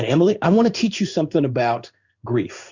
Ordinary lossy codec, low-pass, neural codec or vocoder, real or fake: Opus, 64 kbps; 7.2 kHz; codec, 16 kHz in and 24 kHz out, 1 kbps, XY-Tokenizer; fake